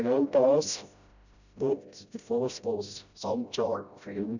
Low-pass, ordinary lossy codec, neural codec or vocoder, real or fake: 7.2 kHz; none; codec, 16 kHz, 0.5 kbps, FreqCodec, smaller model; fake